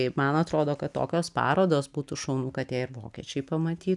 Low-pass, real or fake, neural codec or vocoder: 10.8 kHz; real; none